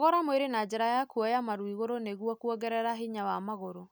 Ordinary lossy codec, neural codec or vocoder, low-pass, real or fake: none; none; none; real